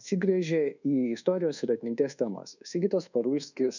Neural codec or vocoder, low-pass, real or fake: codec, 24 kHz, 1.2 kbps, DualCodec; 7.2 kHz; fake